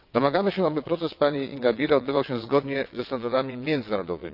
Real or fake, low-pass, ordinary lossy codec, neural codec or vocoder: fake; 5.4 kHz; none; vocoder, 22.05 kHz, 80 mel bands, WaveNeXt